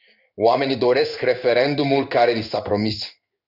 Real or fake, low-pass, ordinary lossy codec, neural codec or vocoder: fake; 5.4 kHz; Opus, 64 kbps; codec, 16 kHz in and 24 kHz out, 1 kbps, XY-Tokenizer